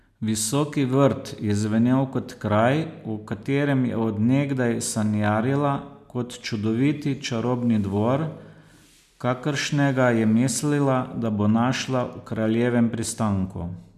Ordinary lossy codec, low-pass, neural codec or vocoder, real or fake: none; 14.4 kHz; none; real